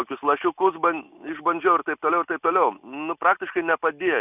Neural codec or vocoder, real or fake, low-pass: none; real; 3.6 kHz